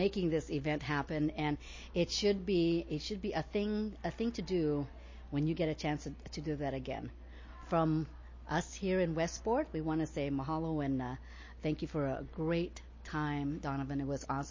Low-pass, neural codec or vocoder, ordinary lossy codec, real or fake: 7.2 kHz; none; MP3, 32 kbps; real